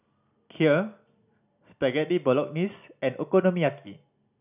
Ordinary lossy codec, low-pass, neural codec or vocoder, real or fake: none; 3.6 kHz; none; real